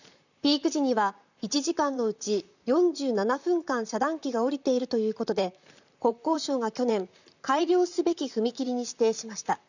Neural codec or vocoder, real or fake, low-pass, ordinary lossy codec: vocoder, 44.1 kHz, 128 mel bands, Pupu-Vocoder; fake; 7.2 kHz; none